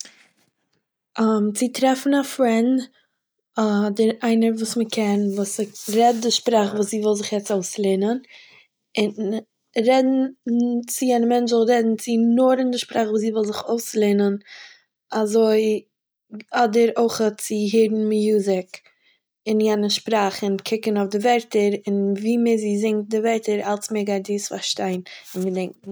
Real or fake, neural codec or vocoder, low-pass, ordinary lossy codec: real; none; none; none